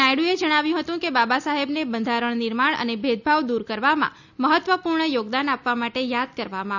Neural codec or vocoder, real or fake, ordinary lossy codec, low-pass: none; real; none; 7.2 kHz